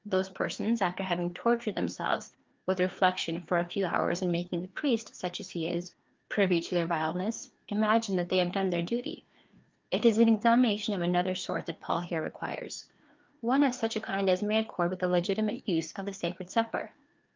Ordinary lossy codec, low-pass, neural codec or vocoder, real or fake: Opus, 24 kbps; 7.2 kHz; codec, 16 kHz, 2 kbps, FreqCodec, larger model; fake